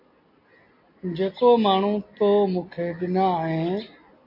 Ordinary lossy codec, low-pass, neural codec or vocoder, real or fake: MP3, 32 kbps; 5.4 kHz; none; real